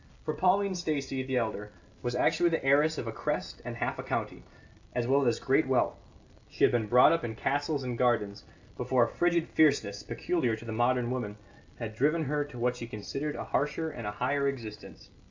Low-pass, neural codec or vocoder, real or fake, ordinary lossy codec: 7.2 kHz; none; real; AAC, 48 kbps